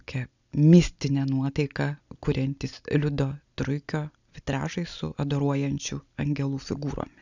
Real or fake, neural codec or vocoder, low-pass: real; none; 7.2 kHz